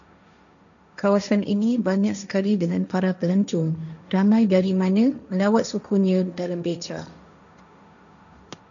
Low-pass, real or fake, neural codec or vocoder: 7.2 kHz; fake; codec, 16 kHz, 1.1 kbps, Voila-Tokenizer